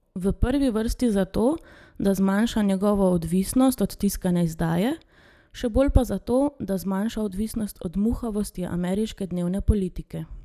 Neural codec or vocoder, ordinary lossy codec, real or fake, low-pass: none; none; real; 14.4 kHz